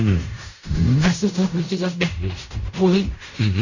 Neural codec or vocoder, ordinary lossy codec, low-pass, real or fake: codec, 16 kHz in and 24 kHz out, 0.4 kbps, LongCat-Audio-Codec, fine tuned four codebook decoder; none; 7.2 kHz; fake